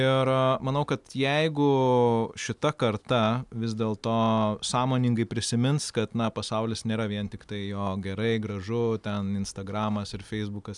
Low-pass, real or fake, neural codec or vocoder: 10.8 kHz; real; none